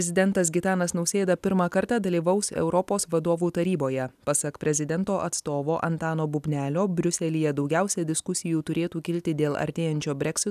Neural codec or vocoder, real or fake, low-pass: none; real; 14.4 kHz